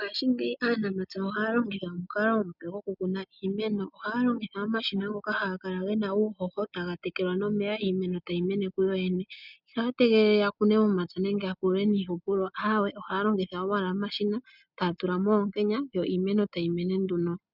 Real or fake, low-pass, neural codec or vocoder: real; 5.4 kHz; none